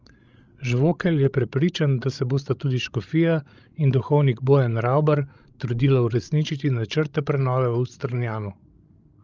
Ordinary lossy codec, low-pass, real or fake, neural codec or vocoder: Opus, 24 kbps; 7.2 kHz; fake; codec, 16 kHz, 16 kbps, FreqCodec, larger model